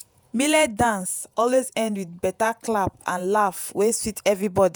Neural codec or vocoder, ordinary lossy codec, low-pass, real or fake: vocoder, 48 kHz, 128 mel bands, Vocos; none; none; fake